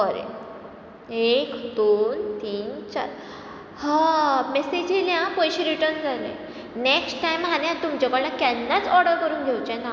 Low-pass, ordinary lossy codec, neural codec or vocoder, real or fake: none; none; none; real